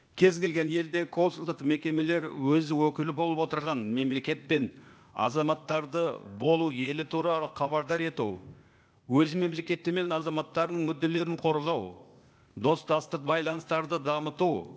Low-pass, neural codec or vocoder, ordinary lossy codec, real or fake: none; codec, 16 kHz, 0.8 kbps, ZipCodec; none; fake